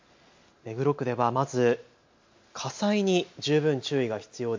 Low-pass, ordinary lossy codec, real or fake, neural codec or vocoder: 7.2 kHz; MP3, 48 kbps; real; none